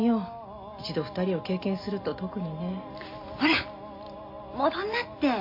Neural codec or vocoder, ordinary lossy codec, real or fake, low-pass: none; MP3, 32 kbps; real; 5.4 kHz